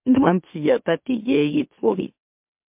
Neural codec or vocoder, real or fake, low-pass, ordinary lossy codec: autoencoder, 44.1 kHz, a latent of 192 numbers a frame, MeloTTS; fake; 3.6 kHz; MP3, 32 kbps